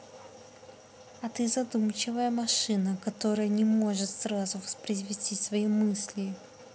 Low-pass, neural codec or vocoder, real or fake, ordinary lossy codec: none; none; real; none